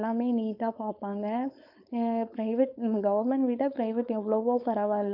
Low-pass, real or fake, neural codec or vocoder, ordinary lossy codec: 5.4 kHz; fake; codec, 16 kHz, 4.8 kbps, FACodec; none